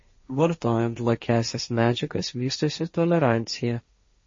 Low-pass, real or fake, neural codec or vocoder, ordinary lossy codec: 7.2 kHz; fake; codec, 16 kHz, 1.1 kbps, Voila-Tokenizer; MP3, 32 kbps